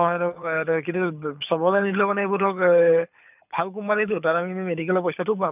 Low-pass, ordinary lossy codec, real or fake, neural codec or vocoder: 3.6 kHz; none; fake; codec, 24 kHz, 6 kbps, HILCodec